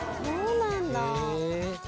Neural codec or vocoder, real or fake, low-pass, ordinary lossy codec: none; real; none; none